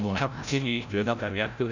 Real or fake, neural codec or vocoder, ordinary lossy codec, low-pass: fake; codec, 16 kHz, 0.5 kbps, FreqCodec, larger model; none; 7.2 kHz